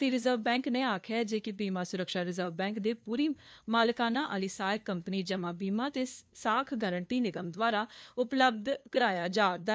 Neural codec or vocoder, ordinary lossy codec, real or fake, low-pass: codec, 16 kHz, 2 kbps, FunCodec, trained on LibriTTS, 25 frames a second; none; fake; none